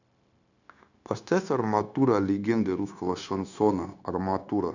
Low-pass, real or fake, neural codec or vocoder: 7.2 kHz; fake; codec, 16 kHz, 0.9 kbps, LongCat-Audio-Codec